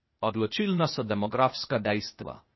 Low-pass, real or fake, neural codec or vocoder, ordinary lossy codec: 7.2 kHz; fake; codec, 16 kHz, 0.8 kbps, ZipCodec; MP3, 24 kbps